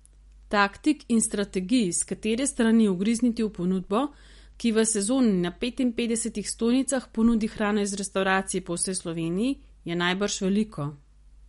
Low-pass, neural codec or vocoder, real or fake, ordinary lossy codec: 19.8 kHz; none; real; MP3, 48 kbps